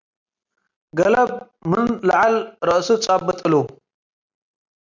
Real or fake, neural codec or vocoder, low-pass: real; none; 7.2 kHz